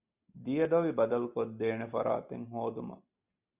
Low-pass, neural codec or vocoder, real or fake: 3.6 kHz; none; real